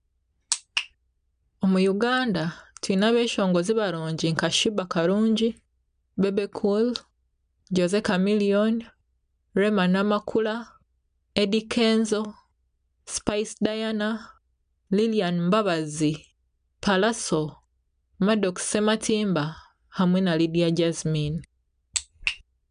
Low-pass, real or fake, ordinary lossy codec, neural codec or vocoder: 9.9 kHz; real; none; none